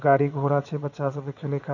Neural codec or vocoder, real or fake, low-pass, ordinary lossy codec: vocoder, 22.05 kHz, 80 mel bands, WaveNeXt; fake; 7.2 kHz; none